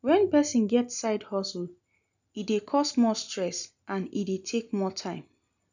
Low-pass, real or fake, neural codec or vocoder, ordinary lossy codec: 7.2 kHz; real; none; none